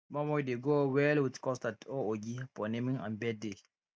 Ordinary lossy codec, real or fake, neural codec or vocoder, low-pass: none; real; none; none